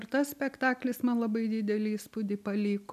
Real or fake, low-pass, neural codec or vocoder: real; 14.4 kHz; none